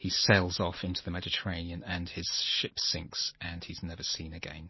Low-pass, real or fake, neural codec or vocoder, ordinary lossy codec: 7.2 kHz; fake; vocoder, 44.1 kHz, 128 mel bands every 512 samples, BigVGAN v2; MP3, 24 kbps